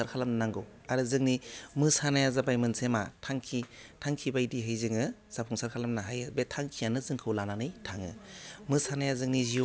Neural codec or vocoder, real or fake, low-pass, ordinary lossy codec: none; real; none; none